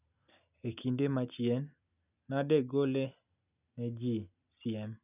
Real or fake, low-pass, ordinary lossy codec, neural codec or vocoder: real; 3.6 kHz; none; none